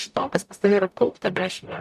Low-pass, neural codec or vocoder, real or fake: 14.4 kHz; codec, 44.1 kHz, 0.9 kbps, DAC; fake